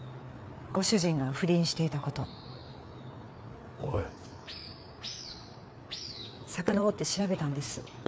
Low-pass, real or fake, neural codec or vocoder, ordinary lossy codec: none; fake; codec, 16 kHz, 4 kbps, FreqCodec, larger model; none